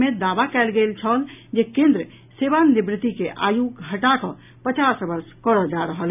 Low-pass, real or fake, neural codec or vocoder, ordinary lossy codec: 3.6 kHz; real; none; none